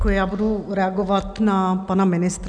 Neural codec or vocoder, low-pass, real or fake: none; 9.9 kHz; real